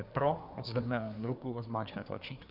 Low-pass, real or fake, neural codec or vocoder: 5.4 kHz; fake; codec, 24 kHz, 1 kbps, SNAC